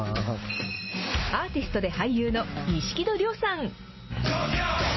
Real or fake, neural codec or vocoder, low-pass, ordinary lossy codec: real; none; 7.2 kHz; MP3, 24 kbps